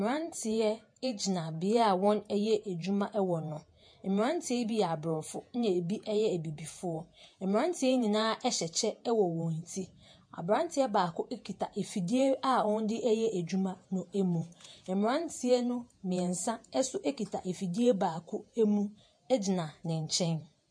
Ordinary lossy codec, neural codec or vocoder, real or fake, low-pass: MP3, 48 kbps; vocoder, 48 kHz, 128 mel bands, Vocos; fake; 9.9 kHz